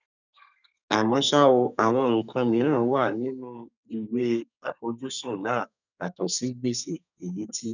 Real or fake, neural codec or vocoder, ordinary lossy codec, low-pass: fake; codec, 32 kHz, 1.9 kbps, SNAC; none; 7.2 kHz